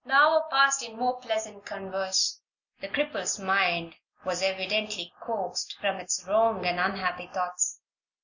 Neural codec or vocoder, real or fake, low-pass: none; real; 7.2 kHz